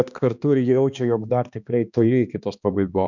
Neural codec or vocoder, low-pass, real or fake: codec, 16 kHz, 2 kbps, X-Codec, HuBERT features, trained on balanced general audio; 7.2 kHz; fake